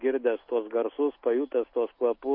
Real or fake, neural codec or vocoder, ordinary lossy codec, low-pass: real; none; MP3, 32 kbps; 5.4 kHz